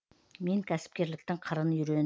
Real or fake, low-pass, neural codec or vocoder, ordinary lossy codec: real; none; none; none